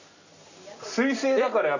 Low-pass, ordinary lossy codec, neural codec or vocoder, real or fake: 7.2 kHz; none; none; real